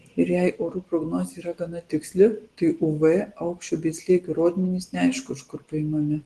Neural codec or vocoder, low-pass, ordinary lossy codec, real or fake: none; 10.8 kHz; Opus, 16 kbps; real